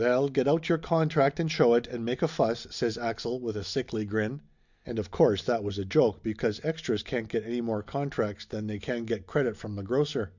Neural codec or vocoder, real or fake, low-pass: none; real; 7.2 kHz